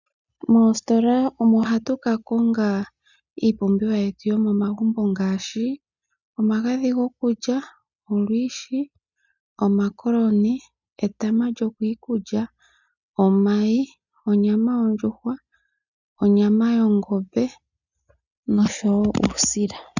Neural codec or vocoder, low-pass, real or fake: none; 7.2 kHz; real